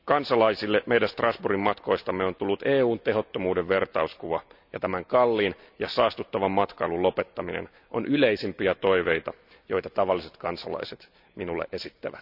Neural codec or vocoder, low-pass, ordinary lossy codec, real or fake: none; 5.4 kHz; none; real